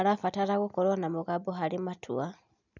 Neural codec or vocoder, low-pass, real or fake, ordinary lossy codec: none; 7.2 kHz; real; none